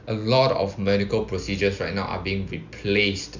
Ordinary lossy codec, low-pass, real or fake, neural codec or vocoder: none; 7.2 kHz; real; none